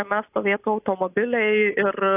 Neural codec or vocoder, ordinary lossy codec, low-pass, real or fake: none; AAC, 24 kbps; 3.6 kHz; real